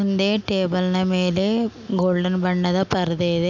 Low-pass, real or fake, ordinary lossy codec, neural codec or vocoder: 7.2 kHz; real; none; none